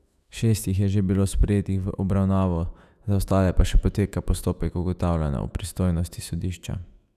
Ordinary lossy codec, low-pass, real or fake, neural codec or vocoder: none; 14.4 kHz; fake; autoencoder, 48 kHz, 128 numbers a frame, DAC-VAE, trained on Japanese speech